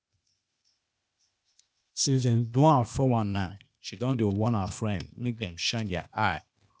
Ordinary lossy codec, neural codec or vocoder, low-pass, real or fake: none; codec, 16 kHz, 0.8 kbps, ZipCodec; none; fake